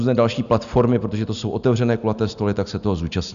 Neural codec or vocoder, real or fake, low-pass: none; real; 7.2 kHz